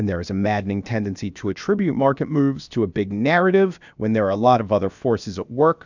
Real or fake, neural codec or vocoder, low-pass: fake; codec, 16 kHz, about 1 kbps, DyCAST, with the encoder's durations; 7.2 kHz